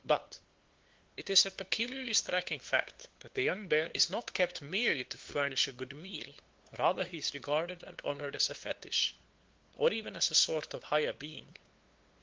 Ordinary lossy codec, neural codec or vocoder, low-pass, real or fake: Opus, 16 kbps; codec, 16 kHz, 2 kbps, FunCodec, trained on LibriTTS, 25 frames a second; 7.2 kHz; fake